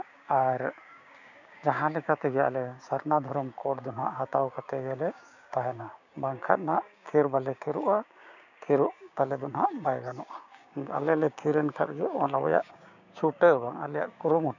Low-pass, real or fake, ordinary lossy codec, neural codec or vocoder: 7.2 kHz; fake; none; codec, 16 kHz, 6 kbps, DAC